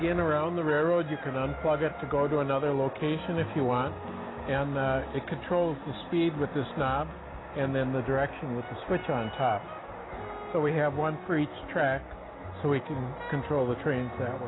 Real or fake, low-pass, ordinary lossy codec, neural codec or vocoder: real; 7.2 kHz; AAC, 16 kbps; none